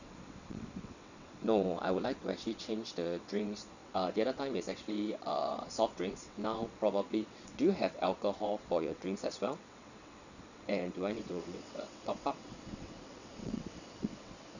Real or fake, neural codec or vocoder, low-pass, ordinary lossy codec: fake; vocoder, 22.05 kHz, 80 mel bands, WaveNeXt; 7.2 kHz; none